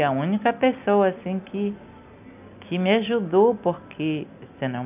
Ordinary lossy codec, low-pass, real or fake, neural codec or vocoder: none; 3.6 kHz; real; none